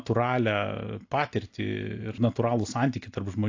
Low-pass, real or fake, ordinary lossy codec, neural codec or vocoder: 7.2 kHz; real; AAC, 48 kbps; none